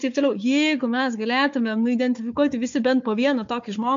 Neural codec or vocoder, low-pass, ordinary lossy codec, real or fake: codec, 16 kHz, 4 kbps, FunCodec, trained on Chinese and English, 50 frames a second; 7.2 kHz; MP3, 48 kbps; fake